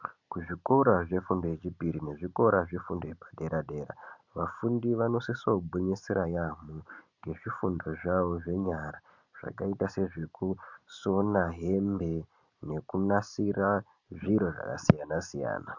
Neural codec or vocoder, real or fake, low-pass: none; real; 7.2 kHz